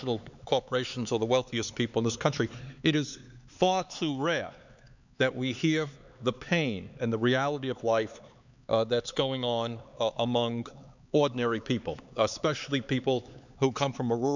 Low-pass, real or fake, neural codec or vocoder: 7.2 kHz; fake; codec, 16 kHz, 4 kbps, X-Codec, HuBERT features, trained on LibriSpeech